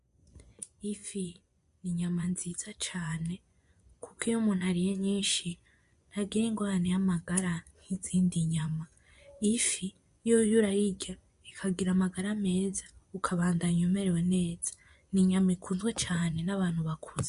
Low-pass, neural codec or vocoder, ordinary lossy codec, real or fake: 10.8 kHz; vocoder, 24 kHz, 100 mel bands, Vocos; MP3, 64 kbps; fake